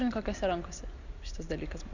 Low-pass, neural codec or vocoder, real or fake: 7.2 kHz; none; real